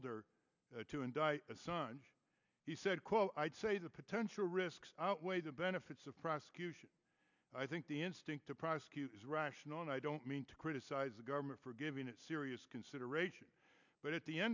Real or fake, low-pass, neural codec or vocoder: real; 7.2 kHz; none